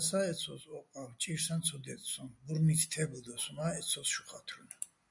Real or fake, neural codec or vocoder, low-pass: real; none; 10.8 kHz